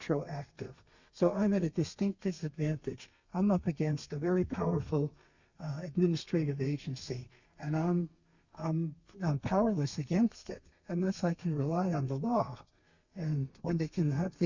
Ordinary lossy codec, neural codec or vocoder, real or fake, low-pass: Opus, 64 kbps; codec, 32 kHz, 1.9 kbps, SNAC; fake; 7.2 kHz